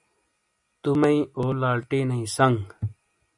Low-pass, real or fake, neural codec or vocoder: 10.8 kHz; real; none